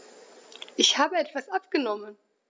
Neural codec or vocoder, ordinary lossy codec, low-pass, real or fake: none; none; none; real